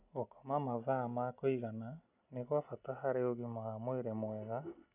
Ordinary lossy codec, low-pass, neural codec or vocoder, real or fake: none; 3.6 kHz; none; real